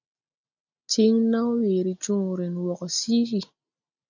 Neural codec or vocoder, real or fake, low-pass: none; real; 7.2 kHz